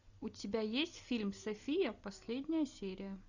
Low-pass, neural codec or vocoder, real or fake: 7.2 kHz; none; real